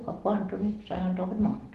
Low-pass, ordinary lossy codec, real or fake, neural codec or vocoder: 14.4 kHz; Opus, 16 kbps; real; none